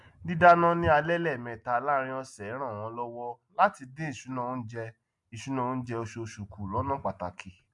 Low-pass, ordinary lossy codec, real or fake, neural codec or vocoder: 10.8 kHz; MP3, 64 kbps; real; none